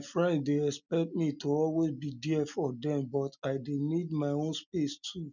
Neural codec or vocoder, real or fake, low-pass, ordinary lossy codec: none; real; 7.2 kHz; none